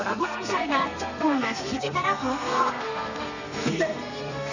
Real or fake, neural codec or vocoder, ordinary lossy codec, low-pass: fake; codec, 32 kHz, 1.9 kbps, SNAC; none; 7.2 kHz